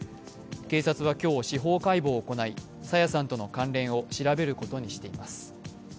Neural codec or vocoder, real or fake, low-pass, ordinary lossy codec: none; real; none; none